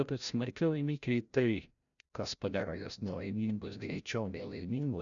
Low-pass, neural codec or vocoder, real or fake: 7.2 kHz; codec, 16 kHz, 0.5 kbps, FreqCodec, larger model; fake